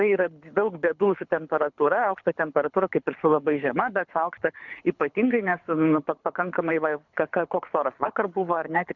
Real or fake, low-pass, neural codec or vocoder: fake; 7.2 kHz; codec, 24 kHz, 6 kbps, HILCodec